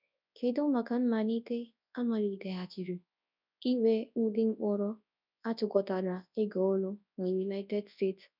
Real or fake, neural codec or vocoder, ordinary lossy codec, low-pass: fake; codec, 24 kHz, 0.9 kbps, WavTokenizer, large speech release; none; 5.4 kHz